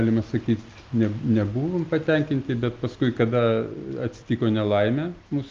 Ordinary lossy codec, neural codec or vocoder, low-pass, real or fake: Opus, 32 kbps; none; 7.2 kHz; real